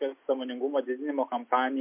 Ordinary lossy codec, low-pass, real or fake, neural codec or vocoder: MP3, 32 kbps; 3.6 kHz; real; none